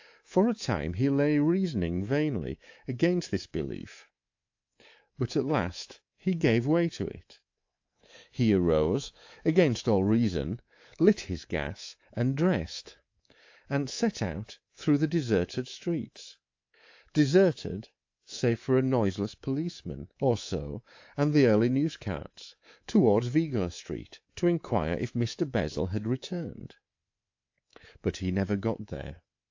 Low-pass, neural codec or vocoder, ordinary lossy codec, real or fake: 7.2 kHz; codec, 24 kHz, 3.1 kbps, DualCodec; AAC, 48 kbps; fake